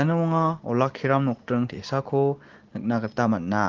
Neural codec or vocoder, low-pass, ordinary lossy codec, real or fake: none; 7.2 kHz; Opus, 16 kbps; real